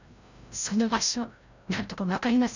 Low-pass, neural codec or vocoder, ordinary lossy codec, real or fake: 7.2 kHz; codec, 16 kHz, 0.5 kbps, FreqCodec, larger model; none; fake